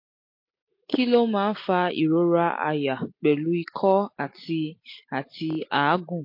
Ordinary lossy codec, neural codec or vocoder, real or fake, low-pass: MP3, 32 kbps; none; real; 5.4 kHz